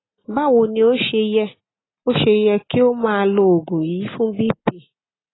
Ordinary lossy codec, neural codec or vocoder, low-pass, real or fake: AAC, 16 kbps; none; 7.2 kHz; real